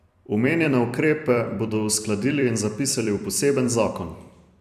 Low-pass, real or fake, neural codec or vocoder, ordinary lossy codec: 14.4 kHz; fake; vocoder, 44.1 kHz, 128 mel bands every 256 samples, BigVGAN v2; AAC, 96 kbps